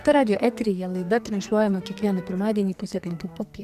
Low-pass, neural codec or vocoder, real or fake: 14.4 kHz; codec, 44.1 kHz, 2.6 kbps, SNAC; fake